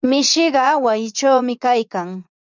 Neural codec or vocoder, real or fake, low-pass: vocoder, 24 kHz, 100 mel bands, Vocos; fake; 7.2 kHz